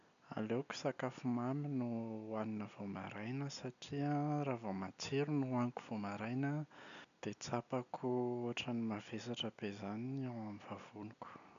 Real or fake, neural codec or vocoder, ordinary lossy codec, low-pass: real; none; none; 7.2 kHz